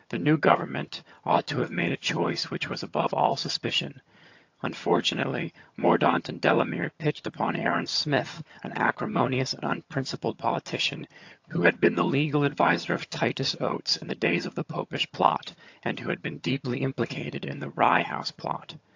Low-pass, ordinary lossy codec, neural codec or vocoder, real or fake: 7.2 kHz; AAC, 48 kbps; vocoder, 22.05 kHz, 80 mel bands, HiFi-GAN; fake